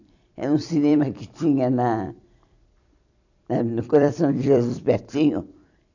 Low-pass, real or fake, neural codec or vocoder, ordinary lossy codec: 7.2 kHz; fake; vocoder, 44.1 kHz, 128 mel bands every 256 samples, BigVGAN v2; none